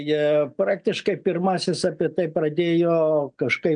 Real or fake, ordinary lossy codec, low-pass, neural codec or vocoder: real; MP3, 96 kbps; 9.9 kHz; none